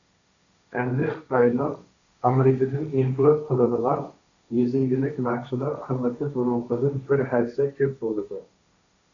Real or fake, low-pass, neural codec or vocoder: fake; 7.2 kHz; codec, 16 kHz, 1.1 kbps, Voila-Tokenizer